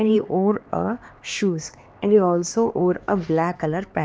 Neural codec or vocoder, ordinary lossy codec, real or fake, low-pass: codec, 16 kHz, 2 kbps, X-Codec, HuBERT features, trained on LibriSpeech; none; fake; none